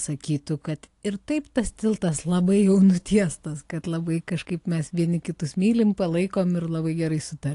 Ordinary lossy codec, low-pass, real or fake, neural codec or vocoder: AAC, 48 kbps; 10.8 kHz; real; none